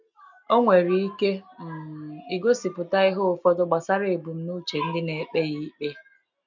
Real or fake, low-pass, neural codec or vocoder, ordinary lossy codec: real; 7.2 kHz; none; none